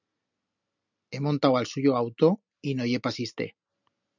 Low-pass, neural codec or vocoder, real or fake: 7.2 kHz; none; real